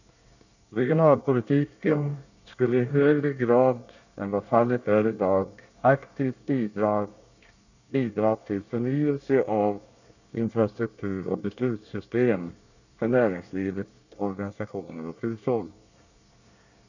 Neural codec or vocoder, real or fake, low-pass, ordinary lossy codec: codec, 24 kHz, 1 kbps, SNAC; fake; 7.2 kHz; none